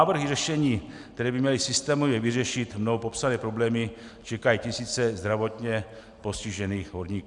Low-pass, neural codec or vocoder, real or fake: 10.8 kHz; none; real